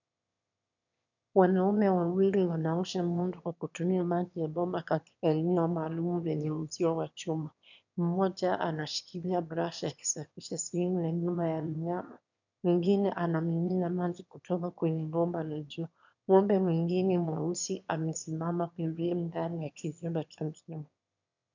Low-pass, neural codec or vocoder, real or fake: 7.2 kHz; autoencoder, 22.05 kHz, a latent of 192 numbers a frame, VITS, trained on one speaker; fake